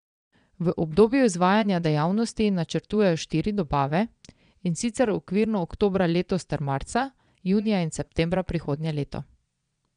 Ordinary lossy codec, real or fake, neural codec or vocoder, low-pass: none; fake; vocoder, 22.05 kHz, 80 mel bands, Vocos; 9.9 kHz